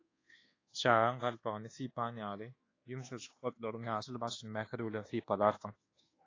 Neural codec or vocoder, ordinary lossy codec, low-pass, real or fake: codec, 24 kHz, 1.2 kbps, DualCodec; AAC, 32 kbps; 7.2 kHz; fake